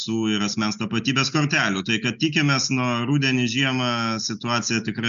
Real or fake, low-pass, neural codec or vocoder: real; 7.2 kHz; none